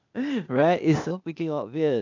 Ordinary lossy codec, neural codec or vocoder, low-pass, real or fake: none; codec, 16 kHz, 0.8 kbps, ZipCodec; 7.2 kHz; fake